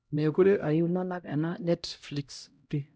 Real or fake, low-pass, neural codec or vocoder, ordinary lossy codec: fake; none; codec, 16 kHz, 0.5 kbps, X-Codec, HuBERT features, trained on LibriSpeech; none